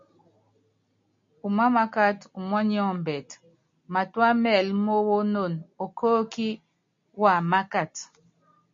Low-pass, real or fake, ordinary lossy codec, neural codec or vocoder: 7.2 kHz; real; MP3, 48 kbps; none